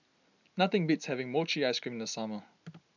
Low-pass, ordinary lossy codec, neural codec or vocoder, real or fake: 7.2 kHz; none; none; real